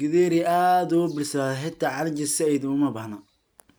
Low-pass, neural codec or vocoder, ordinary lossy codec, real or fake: none; none; none; real